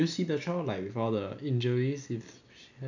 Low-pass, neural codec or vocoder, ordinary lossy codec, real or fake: 7.2 kHz; none; none; real